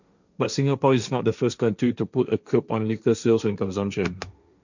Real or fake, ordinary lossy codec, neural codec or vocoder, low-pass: fake; none; codec, 16 kHz, 1.1 kbps, Voila-Tokenizer; 7.2 kHz